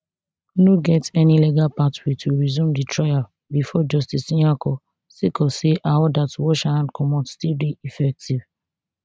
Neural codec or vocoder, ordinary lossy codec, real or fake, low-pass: none; none; real; none